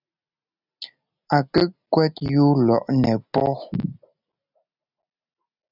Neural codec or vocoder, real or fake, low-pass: none; real; 5.4 kHz